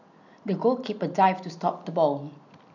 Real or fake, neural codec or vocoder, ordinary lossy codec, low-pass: real; none; none; 7.2 kHz